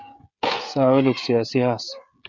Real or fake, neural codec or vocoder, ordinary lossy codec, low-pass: fake; codec, 16 kHz, 16 kbps, FreqCodec, smaller model; Opus, 64 kbps; 7.2 kHz